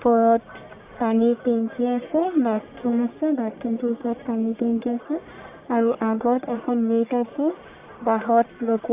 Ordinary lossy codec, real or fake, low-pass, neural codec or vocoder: Opus, 64 kbps; fake; 3.6 kHz; codec, 44.1 kHz, 1.7 kbps, Pupu-Codec